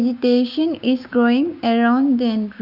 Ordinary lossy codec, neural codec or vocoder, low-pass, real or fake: none; codec, 16 kHz, 6 kbps, DAC; 5.4 kHz; fake